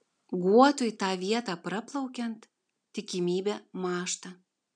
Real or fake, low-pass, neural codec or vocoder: real; 9.9 kHz; none